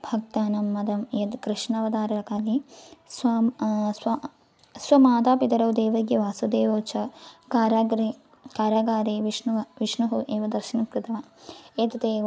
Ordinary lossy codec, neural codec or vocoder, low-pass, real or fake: none; none; none; real